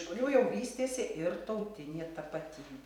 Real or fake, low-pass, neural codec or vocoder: fake; 19.8 kHz; vocoder, 44.1 kHz, 128 mel bands every 256 samples, BigVGAN v2